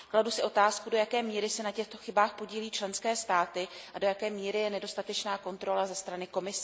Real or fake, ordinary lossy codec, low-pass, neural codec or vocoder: real; none; none; none